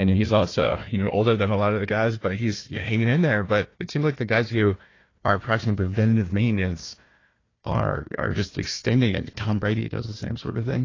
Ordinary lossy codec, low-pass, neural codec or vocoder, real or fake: AAC, 32 kbps; 7.2 kHz; codec, 16 kHz, 1 kbps, FunCodec, trained on Chinese and English, 50 frames a second; fake